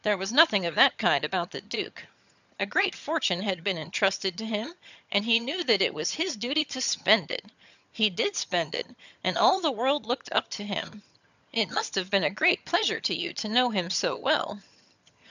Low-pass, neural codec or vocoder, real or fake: 7.2 kHz; vocoder, 22.05 kHz, 80 mel bands, HiFi-GAN; fake